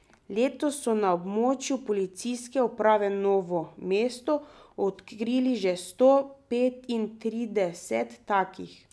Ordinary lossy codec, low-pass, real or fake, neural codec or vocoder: none; none; real; none